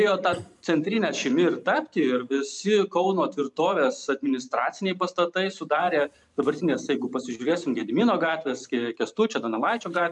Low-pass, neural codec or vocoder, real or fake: 10.8 kHz; vocoder, 44.1 kHz, 128 mel bands every 512 samples, BigVGAN v2; fake